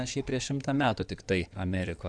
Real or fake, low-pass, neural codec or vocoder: fake; 9.9 kHz; codec, 16 kHz in and 24 kHz out, 2.2 kbps, FireRedTTS-2 codec